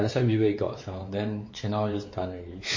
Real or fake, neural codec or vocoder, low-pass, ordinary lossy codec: fake; codec, 16 kHz, 4 kbps, X-Codec, WavLM features, trained on Multilingual LibriSpeech; 7.2 kHz; MP3, 32 kbps